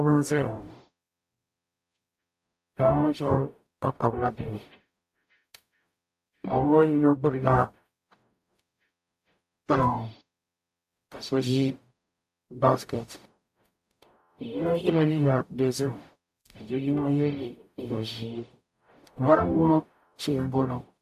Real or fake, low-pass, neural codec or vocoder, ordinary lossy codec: fake; 14.4 kHz; codec, 44.1 kHz, 0.9 kbps, DAC; AAC, 96 kbps